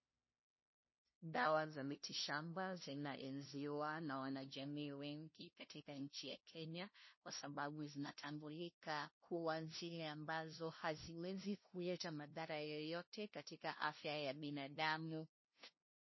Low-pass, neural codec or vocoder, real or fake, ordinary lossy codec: 7.2 kHz; codec, 16 kHz, 0.5 kbps, FunCodec, trained on LibriTTS, 25 frames a second; fake; MP3, 24 kbps